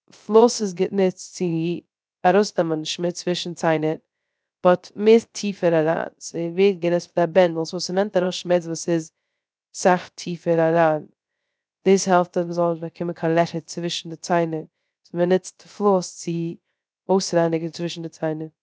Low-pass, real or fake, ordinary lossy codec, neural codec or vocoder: none; fake; none; codec, 16 kHz, 0.3 kbps, FocalCodec